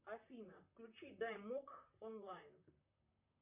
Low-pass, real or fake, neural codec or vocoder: 3.6 kHz; fake; vocoder, 44.1 kHz, 128 mel bands, Pupu-Vocoder